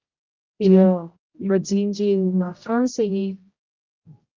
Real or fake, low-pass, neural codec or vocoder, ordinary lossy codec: fake; 7.2 kHz; codec, 16 kHz, 0.5 kbps, X-Codec, HuBERT features, trained on general audio; Opus, 32 kbps